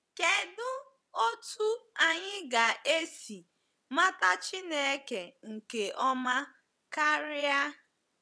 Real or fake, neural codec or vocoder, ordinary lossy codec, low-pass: fake; vocoder, 22.05 kHz, 80 mel bands, WaveNeXt; none; none